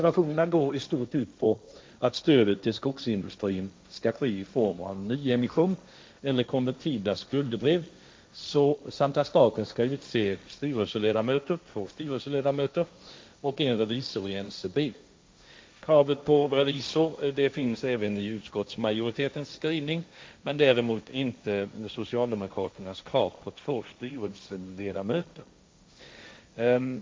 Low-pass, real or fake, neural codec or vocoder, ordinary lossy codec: none; fake; codec, 16 kHz, 1.1 kbps, Voila-Tokenizer; none